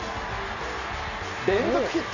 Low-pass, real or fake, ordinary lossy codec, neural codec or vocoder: 7.2 kHz; real; none; none